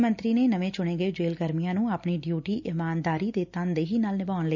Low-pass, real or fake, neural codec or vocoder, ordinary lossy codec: 7.2 kHz; real; none; none